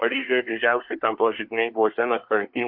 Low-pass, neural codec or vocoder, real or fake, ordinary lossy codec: 5.4 kHz; codec, 24 kHz, 1 kbps, SNAC; fake; AAC, 48 kbps